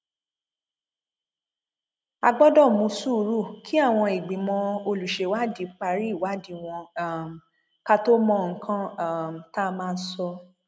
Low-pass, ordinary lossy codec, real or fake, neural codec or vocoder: none; none; real; none